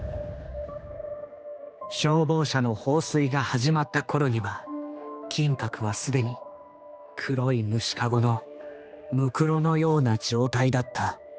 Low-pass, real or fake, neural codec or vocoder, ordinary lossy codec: none; fake; codec, 16 kHz, 2 kbps, X-Codec, HuBERT features, trained on general audio; none